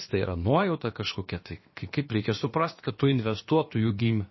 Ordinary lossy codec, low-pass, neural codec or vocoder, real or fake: MP3, 24 kbps; 7.2 kHz; codec, 16 kHz, about 1 kbps, DyCAST, with the encoder's durations; fake